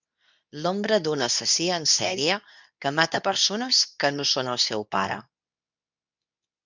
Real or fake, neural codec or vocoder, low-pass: fake; codec, 24 kHz, 0.9 kbps, WavTokenizer, medium speech release version 2; 7.2 kHz